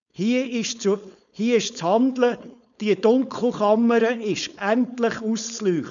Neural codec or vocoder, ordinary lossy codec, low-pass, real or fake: codec, 16 kHz, 4.8 kbps, FACodec; none; 7.2 kHz; fake